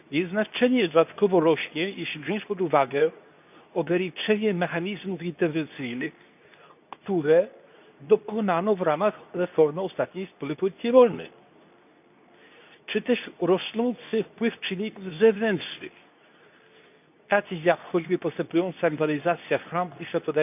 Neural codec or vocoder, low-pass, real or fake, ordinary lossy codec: codec, 24 kHz, 0.9 kbps, WavTokenizer, medium speech release version 2; 3.6 kHz; fake; none